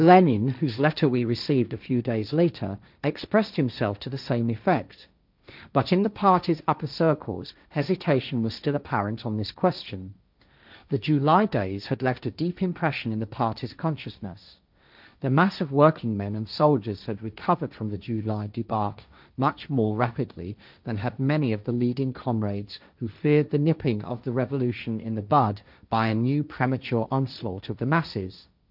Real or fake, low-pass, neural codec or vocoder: fake; 5.4 kHz; codec, 16 kHz, 1.1 kbps, Voila-Tokenizer